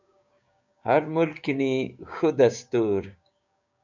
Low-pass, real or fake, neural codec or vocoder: 7.2 kHz; fake; codec, 16 kHz, 6 kbps, DAC